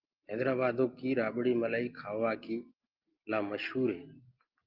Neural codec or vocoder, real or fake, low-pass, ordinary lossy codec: none; real; 5.4 kHz; Opus, 32 kbps